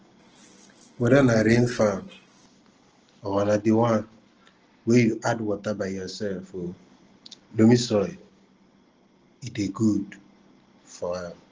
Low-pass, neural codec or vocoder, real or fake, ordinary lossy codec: 7.2 kHz; none; real; Opus, 16 kbps